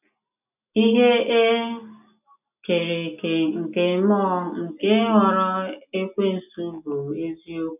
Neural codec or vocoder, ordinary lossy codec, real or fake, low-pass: none; none; real; 3.6 kHz